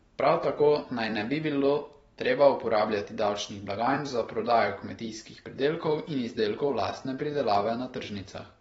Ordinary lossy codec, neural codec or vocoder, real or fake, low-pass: AAC, 24 kbps; vocoder, 44.1 kHz, 128 mel bands every 256 samples, BigVGAN v2; fake; 19.8 kHz